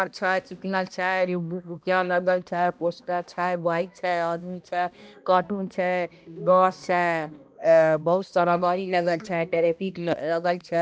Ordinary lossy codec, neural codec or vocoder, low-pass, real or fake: none; codec, 16 kHz, 1 kbps, X-Codec, HuBERT features, trained on balanced general audio; none; fake